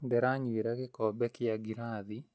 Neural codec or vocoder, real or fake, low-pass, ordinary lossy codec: none; real; none; none